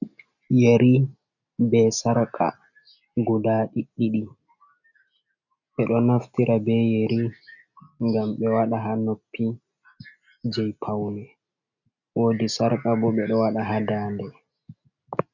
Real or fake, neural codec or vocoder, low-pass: real; none; 7.2 kHz